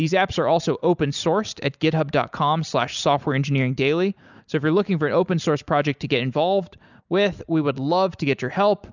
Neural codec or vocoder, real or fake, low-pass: none; real; 7.2 kHz